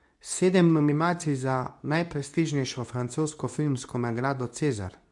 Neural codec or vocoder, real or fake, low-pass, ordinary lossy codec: codec, 24 kHz, 0.9 kbps, WavTokenizer, medium speech release version 2; fake; 10.8 kHz; none